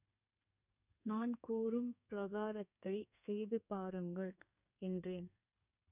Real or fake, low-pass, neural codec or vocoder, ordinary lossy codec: fake; 3.6 kHz; codec, 32 kHz, 1.9 kbps, SNAC; none